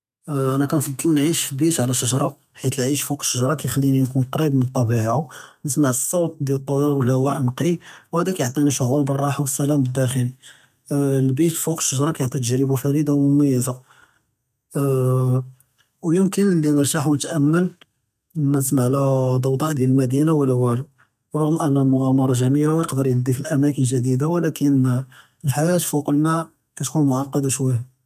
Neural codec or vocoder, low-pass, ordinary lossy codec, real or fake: codec, 32 kHz, 1.9 kbps, SNAC; 14.4 kHz; none; fake